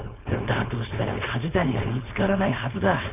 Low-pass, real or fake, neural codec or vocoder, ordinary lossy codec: 3.6 kHz; fake; codec, 16 kHz, 4.8 kbps, FACodec; none